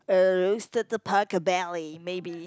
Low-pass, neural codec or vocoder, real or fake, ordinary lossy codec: none; none; real; none